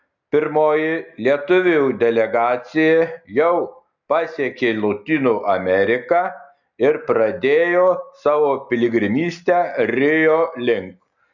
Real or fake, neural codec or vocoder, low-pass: real; none; 7.2 kHz